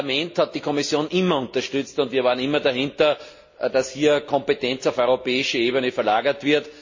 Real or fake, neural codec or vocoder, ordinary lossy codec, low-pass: real; none; MP3, 32 kbps; 7.2 kHz